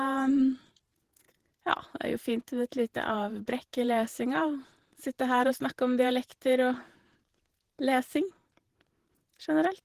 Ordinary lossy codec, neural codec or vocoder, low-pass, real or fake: Opus, 16 kbps; vocoder, 48 kHz, 128 mel bands, Vocos; 14.4 kHz; fake